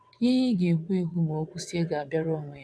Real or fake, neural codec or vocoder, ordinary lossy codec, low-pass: fake; vocoder, 22.05 kHz, 80 mel bands, WaveNeXt; none; none